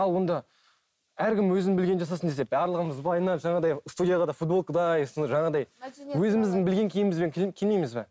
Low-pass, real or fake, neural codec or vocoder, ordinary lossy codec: none; real; none; none